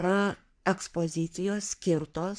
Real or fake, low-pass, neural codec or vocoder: fake; 9.9 kHz; codec, 44.1 kHz, 3.4 kbps, Pupu-Codec